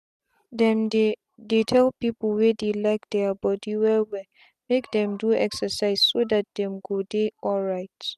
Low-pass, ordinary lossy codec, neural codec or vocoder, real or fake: 14.4 kHz; none; none; real